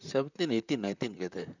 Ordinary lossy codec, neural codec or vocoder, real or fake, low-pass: none; vocoder, 44.1 kHz, 128 mel bands, Pupu-Vocoder; fake; 7.2 kHz